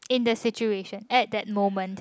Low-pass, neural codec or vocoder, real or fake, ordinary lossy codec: none; none; real; none